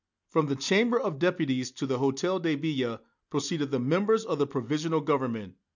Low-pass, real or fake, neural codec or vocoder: 7.2 kHz; real; none